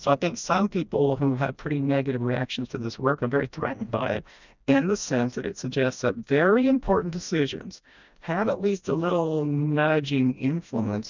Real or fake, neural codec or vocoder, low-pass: fake; codec, 16 kHz, 1 kbps, FreqCodec, smaller model; 7.2 kHz